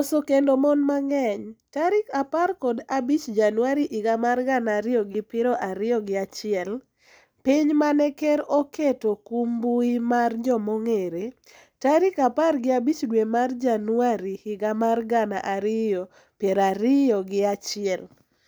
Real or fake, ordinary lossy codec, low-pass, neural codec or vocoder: real; none; none; none